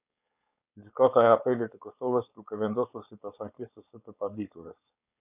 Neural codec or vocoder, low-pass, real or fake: codec, 16 kHz, 4.8 kbps, FACodec; 3.6 kHz; fake